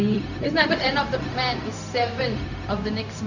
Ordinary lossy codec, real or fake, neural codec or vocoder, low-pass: none; fake; codec, 16 kHz, 0.4 kbps, LongCat-Audio-Codec; 7.2 kHz